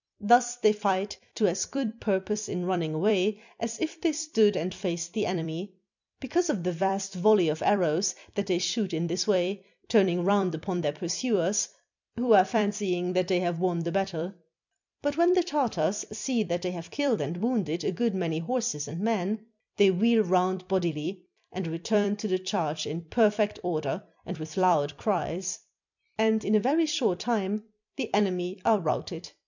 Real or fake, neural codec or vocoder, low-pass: fake; vocoder, 44.1 kHz, 128 mel bands every 256 samples, BigVGAN v2; 7.2 kHz